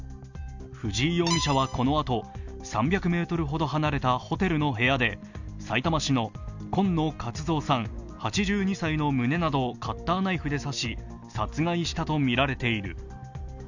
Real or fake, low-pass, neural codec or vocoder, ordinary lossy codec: real; 7.2 kHz; none; none